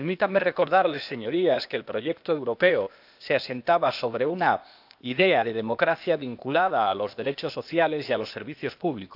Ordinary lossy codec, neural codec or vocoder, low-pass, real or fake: none; codec, 16 kHz, 0.8 kbps, ZipCodec; 5.4 kHz; fake